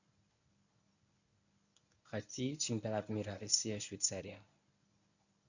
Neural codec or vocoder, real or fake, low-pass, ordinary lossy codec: codec, 24 kHz, 0.9 kbps, WavTokenizer, medium speech release version 1; fake; 7.2 kHz; none